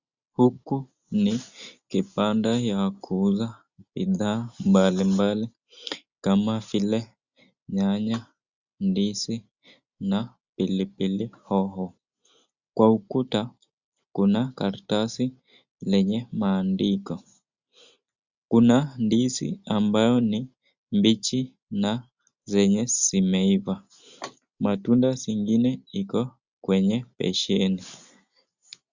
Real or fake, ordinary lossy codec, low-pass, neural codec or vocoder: real; Opus, 64 kbps; 7.2 kHz; none